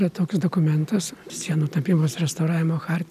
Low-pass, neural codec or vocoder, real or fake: 14.4 kHz; none; real